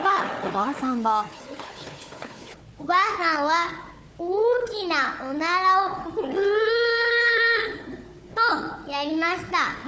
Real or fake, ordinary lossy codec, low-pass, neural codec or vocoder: fake; none; none; codec, 16 kHz, 4 kbps, FunCodec, trained on Chinese and English, 50 frames a second